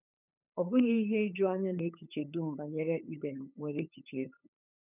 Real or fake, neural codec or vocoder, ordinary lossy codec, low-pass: fake; codec, 16 kHz, 8 kbps, FunCodec, trained on LibriTTS, 25 frames a second; none; 3.6 kHz